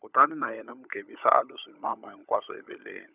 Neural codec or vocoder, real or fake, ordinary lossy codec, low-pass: codec, 16 kHz, 16 kbps, FunCodec, trained on LibriTTS, 50 frames a second; fake; none; 3.6 kHz